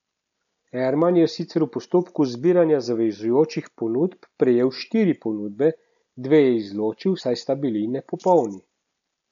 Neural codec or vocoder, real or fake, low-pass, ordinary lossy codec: none; real; 7.2 kHz; none